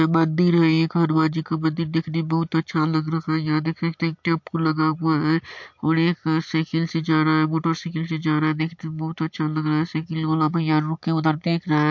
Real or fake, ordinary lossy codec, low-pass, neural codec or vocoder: real; MP3, 48 kbps; 7.2 kHz; none